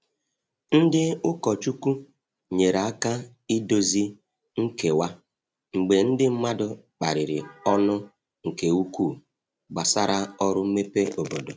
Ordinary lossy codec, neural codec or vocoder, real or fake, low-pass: none; none; real; none